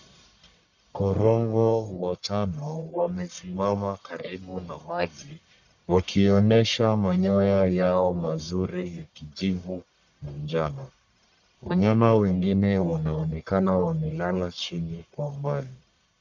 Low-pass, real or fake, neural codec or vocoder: 7.2 kHz; fake; codec, 44.1 kHz, 1.7 kbps, Pupu-Codec